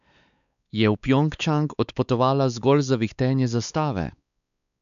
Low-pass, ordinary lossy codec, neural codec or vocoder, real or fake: 7.2 kHz; none; codec, 16 kHz, 4 kbps, X-Codec, WavLM features, trained on Multilingual LibriSpeech; fake